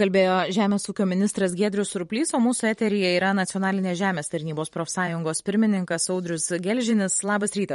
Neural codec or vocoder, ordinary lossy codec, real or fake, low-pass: vocoder, 44.1 kHz, 128 mel bands every 512 samples, BigVGAN v2; MP3, 48 kbps; fake; 19.8 kHz